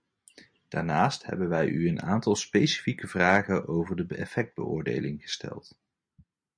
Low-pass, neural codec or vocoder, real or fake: 9.9 kHz; none; real